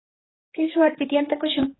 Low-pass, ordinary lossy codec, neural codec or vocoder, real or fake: 7.2 kHz; AAC, 16 kbps; codec, 16 kHz, 4 kbps, X-Codec, HuBERT features, trained on general audio; fake